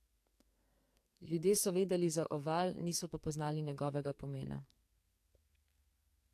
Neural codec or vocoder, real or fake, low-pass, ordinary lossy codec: codec, 44.1 kHz, 2.6 kbps, SNAC; fake; 14.4 kHz; AAC, 64 kbps